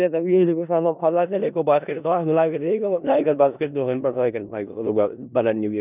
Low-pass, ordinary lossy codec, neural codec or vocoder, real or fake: 3.6 kHz; none; codec, 16 kHz in and 24 kHz out, 0.4 kbps, LongCat-Audio-Codec, four codebook decoder; fake